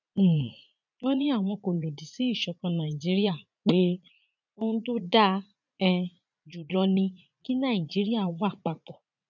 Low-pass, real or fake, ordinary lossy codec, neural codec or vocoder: 7.2 kHz; fake; none; vocoder, 44.1 kHz, 80 mel bands, Vocos